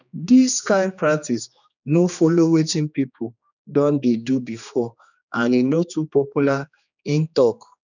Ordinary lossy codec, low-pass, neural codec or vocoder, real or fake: none; 7.2 kHz; codec, 16 kHz, 2 kbps, X-Codec, HuBERT features, trained on general audio; fake